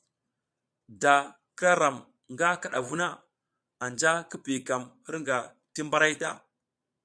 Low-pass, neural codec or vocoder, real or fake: 9.9 kHz; vocoder, 22.05 kHz, 80 mel bands, Vocos; fake